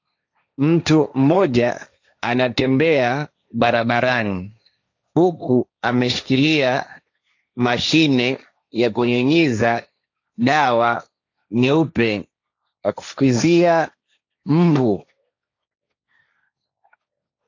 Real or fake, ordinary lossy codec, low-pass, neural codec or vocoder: fake; AAC, 48 kbps; 7.2 kHz; codec, 16 kHz, 1.1 kbps, Voila-Tokenizer